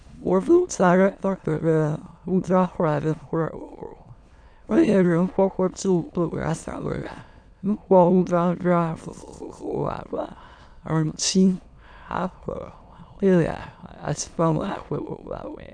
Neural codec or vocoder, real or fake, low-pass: autoencoder, 22.05 kHz, a latent of 192 numbers a frame, VITS, trained on many speakers; fake; 9.9 kHz